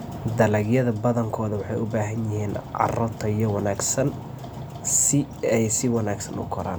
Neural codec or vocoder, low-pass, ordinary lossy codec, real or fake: none; none; none; real